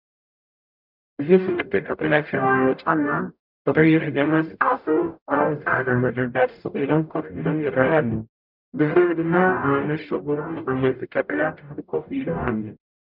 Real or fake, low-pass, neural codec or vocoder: fake; 5.4 kHz; codec, 44.1 kHz, 0.9 kbps, DAC